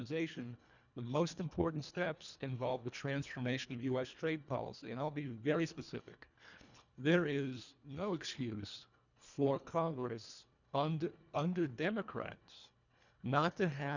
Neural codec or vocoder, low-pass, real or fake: codec, 24 kHz, 1.5 kbps, HILCodec; 7.2 kHz; fake